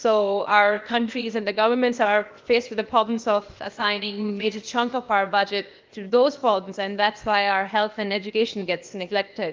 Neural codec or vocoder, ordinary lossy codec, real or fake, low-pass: codec, 16 kHz, 0.8 kbps, ZipCodec; Opus, 32 kbps; fake; 7.2 kHz